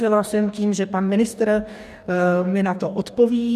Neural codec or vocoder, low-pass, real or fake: codec, 44.1 kHz, 2.6 kbps, DAC; 14.4 kHz; fake